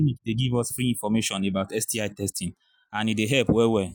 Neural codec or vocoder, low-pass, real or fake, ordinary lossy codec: none; none; real; none